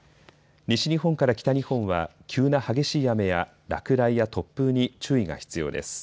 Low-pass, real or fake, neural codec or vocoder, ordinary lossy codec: none; real; none; none